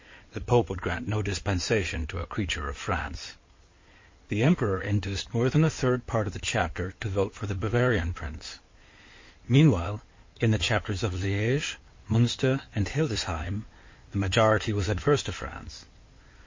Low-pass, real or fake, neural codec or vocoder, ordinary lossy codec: 7.2 kHz; fake; codec, 16 kHz in and 24 kHz out, 2.2 kbps, FireRedTTS-2 codec; MP3, 32 kbps